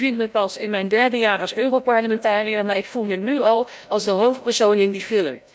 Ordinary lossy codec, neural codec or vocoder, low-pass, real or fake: none; codec, 16 kHz, 0.5 kbps, FreqCodec, larger model; none; fake